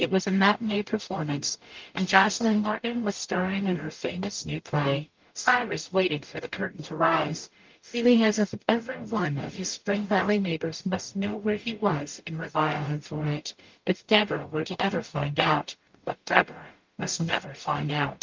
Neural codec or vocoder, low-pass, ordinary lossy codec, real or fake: codec, 44.1 kHz, 0.9 kbps, DAC; 7.2 kHz; Opus, 24 kbps; fake